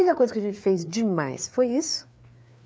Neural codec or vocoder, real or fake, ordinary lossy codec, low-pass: codec, 16 kHz, 4 kbps, FreqCodec, larger model; fake; none; none